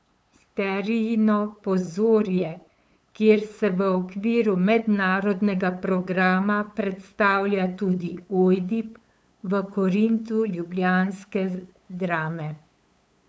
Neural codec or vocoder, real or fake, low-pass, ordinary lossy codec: codec, 16 kHz, 8 kbps, FunCodec, trained on LibriTTS, 25 frames a second; fake; none; none